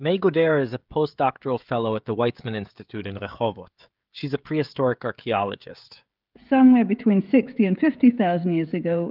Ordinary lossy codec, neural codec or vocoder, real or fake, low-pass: Opus, 24 kbps; codec, 16 kHz, 16 kbps, FreqCodec, smaller model; fake; 5.4 kHz